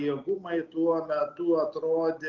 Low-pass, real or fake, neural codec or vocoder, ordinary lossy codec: 7.2 kHz; real; none; Opus, 24 kbps